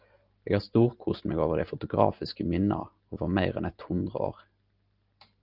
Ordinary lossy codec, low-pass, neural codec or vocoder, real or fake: Opus, 32 kbps; 5.4 kHz; none; real